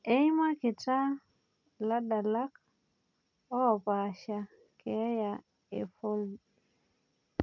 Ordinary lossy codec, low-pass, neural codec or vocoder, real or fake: none; 7.2 kHz; none; real